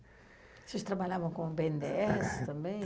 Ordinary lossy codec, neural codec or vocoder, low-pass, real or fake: none; none; none; real